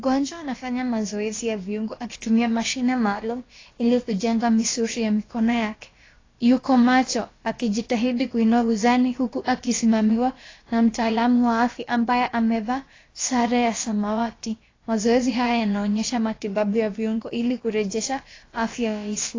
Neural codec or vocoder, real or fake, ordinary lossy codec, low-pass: codec, 16 kHz, about 1 kbps, DyCAST, with the encoder's durations; fake; AAC, 32 kbps; 7.2 kHz